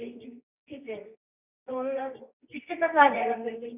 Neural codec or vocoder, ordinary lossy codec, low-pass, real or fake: codec, 24 kHz, 0.9 kbps, WavTokenizer, medium music audio release; none; 3.6 kHz; fake